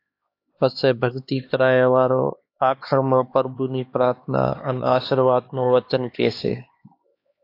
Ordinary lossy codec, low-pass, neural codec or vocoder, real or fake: AAC, 32 kbps; 5.4 kHz; codec, 16 kHz, 2 kbps, X-Codec, HuBERT features, trained on LibriSpeech; fake